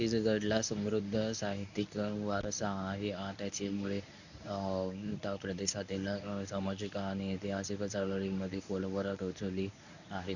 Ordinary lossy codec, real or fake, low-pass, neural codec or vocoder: none; fake; 7.2 kHz; codec, 24 kHz, 0.9 kbps, WavTokenizer, medium speech release version 1